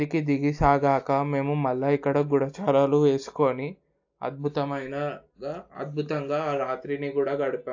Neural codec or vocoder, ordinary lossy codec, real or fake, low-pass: none; AAC, 48 kbps; real; 7.2 kHz